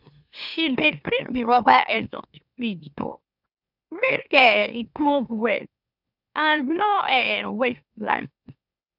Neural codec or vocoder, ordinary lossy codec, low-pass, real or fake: autoencoder, 44.1 kHz, a latent of 192 numbers a frame, MeloTTS; none; 5.4 kHz; fake